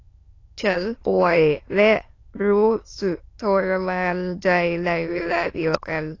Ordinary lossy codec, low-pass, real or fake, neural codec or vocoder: AAC, 32 kbps; 7.2 kHz; fake; autoencoder, 22.05 kHz, a latent of 192 numbers a frame, VITS, trained on many speakers